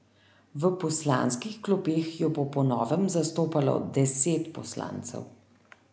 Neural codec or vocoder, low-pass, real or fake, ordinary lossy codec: none; none; real; none